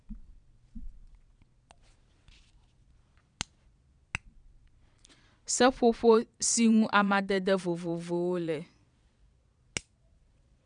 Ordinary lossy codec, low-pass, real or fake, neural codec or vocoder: none; 9.9 kHz; fake; vocoder, 22.05 kHz, 80 mel bands, Vocos